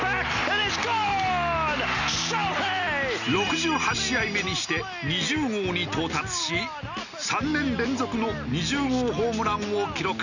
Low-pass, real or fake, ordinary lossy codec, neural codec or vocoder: 7.2 kHz; real; none; none